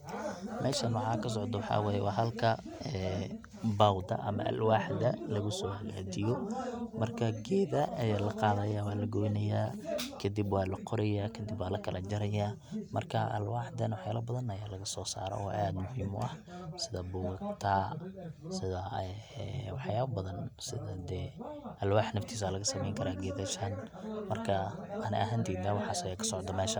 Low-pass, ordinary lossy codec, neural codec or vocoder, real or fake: 19.8 kHz; none; none; real